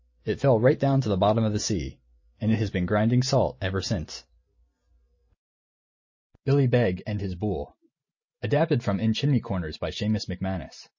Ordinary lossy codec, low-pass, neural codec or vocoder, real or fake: MP3, 32 kbps; 7.2 kHz; none; real